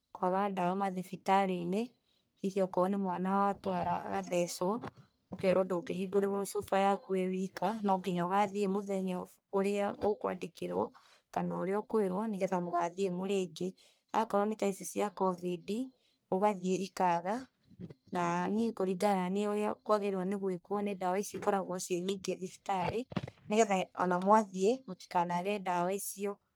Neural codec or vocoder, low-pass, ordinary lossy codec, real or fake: codec, 44.1 kHz, 1.7 kbps, Pupu-Codec; none; none; fake